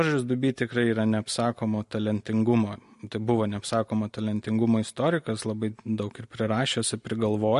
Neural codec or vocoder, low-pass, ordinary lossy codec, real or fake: none; 14.4 kHz; MP3, 48 kbps; real